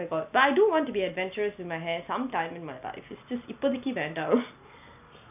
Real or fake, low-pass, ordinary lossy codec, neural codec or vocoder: real; 3.6 kHz; none; none